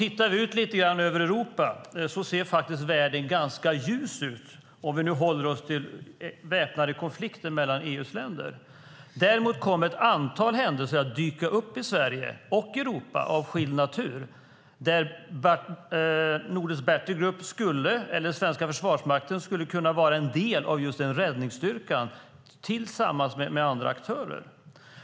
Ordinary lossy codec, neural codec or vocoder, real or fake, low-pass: none; none; real; none